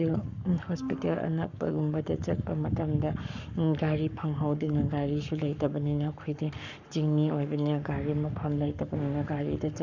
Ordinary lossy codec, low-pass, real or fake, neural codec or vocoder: none; 7.2 kHz; fake; codec, 44.1 kHz, 7.8 kbps, Pupu-Codec